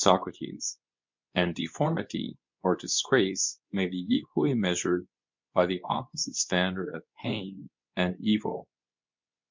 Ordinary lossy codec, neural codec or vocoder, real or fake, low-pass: MP3, 64 kbps; codec, 24 kHz, 0.9 kbps, WavTokenizer, medium speech release version 2; fake; 7.2 kHz